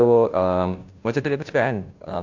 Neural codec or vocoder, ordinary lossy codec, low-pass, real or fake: codec, 16 kHz, 0.5 kbps, FunCodec, trained on Chinese and English, 25 frames a second; AAC, 48 kbps; 7.2 kHz; fake